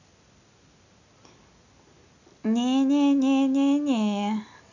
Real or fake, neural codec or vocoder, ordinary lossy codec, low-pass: real; none; none; 7.2 kHz